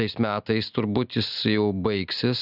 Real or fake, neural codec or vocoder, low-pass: real; none; 5.4 kHz